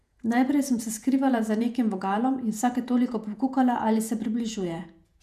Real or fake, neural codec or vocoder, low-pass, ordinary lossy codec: fake; vocoder, 48 kHz, 128 mel bands, Vocos; 14.4 kHz; none